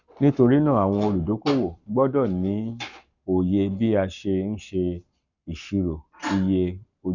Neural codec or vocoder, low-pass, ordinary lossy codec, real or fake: codec, 44.1 kHz, 7.8 kbps, Pupu-Codec; 7.2 kHz; none; fake